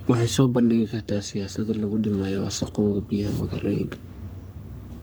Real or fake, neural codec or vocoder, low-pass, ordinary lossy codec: fake; codec, 44.1 kHz, 3.4 kbps, Pupu-Codec; none; none